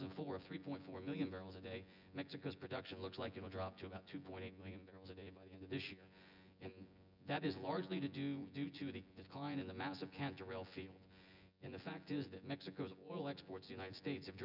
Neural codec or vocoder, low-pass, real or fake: vocoder, 24 kHz, 100 mel bands, Vocos; 5.4 kHz; fake